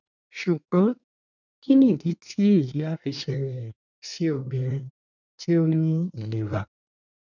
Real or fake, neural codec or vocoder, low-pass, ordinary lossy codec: fake; codec, 24 kHz, 1 kbps, SNAC; 7.2 kHz; none